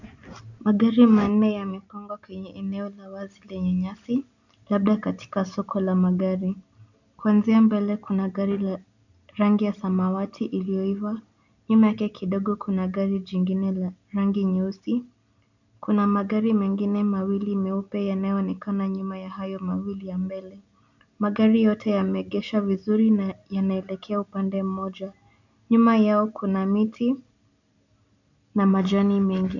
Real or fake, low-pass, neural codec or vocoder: real; 7.2 kHz; none